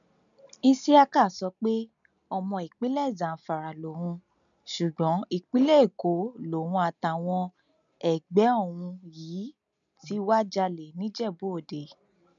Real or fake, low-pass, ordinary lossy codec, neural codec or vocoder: real; 7.2 kHz; none; none